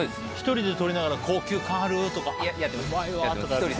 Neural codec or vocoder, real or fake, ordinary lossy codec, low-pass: none; real; none; none